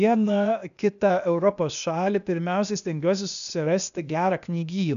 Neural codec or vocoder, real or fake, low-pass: codec, 16 kHz, 0.8 kbps, ZipCodec; fake; 7.2 kHz